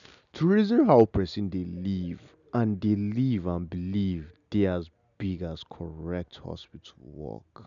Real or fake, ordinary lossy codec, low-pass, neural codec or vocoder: real; none; 7.2 kHz; none